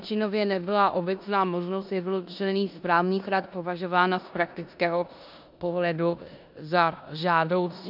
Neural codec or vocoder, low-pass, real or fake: codec, 16 kHz in and 24 kHz out, 0.9 kbps, LongCat-Audio-Codec, four codebook decoder; 5.4 kHz; fake